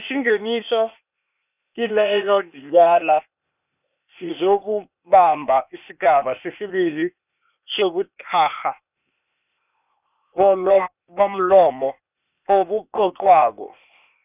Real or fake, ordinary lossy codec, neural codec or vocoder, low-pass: fake; none; codec, 16 kHz, 0.8 kbps, ZipCodec; 3.6 kHz